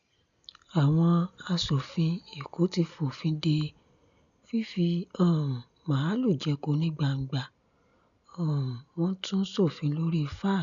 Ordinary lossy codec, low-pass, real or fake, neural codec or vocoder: none; 7.2 kHz; real; none